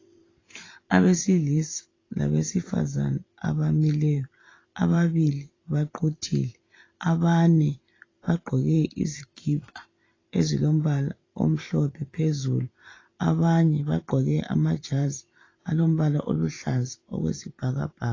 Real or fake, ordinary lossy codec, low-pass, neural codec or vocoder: fake; AAC, 32 kbps; 7.2 kHz; vocoder, 44.1 kHz, 128 mel bands every 512 samples, BigVGAN v2